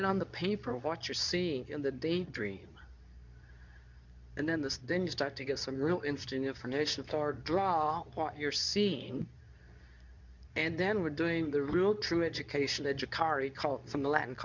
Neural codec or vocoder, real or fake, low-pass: codec, 24 kHz, 0.9 kbps, WavTokenizer, medium speech release version 2; fake; 7.2 kHz